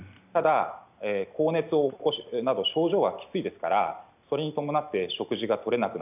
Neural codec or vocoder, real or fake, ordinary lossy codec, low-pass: none; real; none; 3.6 kHz